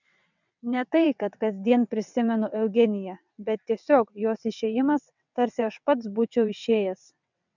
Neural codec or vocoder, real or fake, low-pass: vocoder, 22.05 kHz, 80 mel bands, WaveNeXt; fake; 7.2 kHz